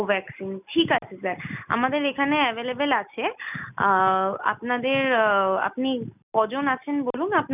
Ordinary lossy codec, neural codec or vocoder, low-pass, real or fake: none; none; 3.6 kHz; real